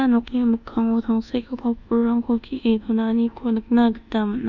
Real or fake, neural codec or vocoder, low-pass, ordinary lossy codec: fake; codec, 24 kHz, 1.2 kbps, DualCodec; 7.2 kHz; none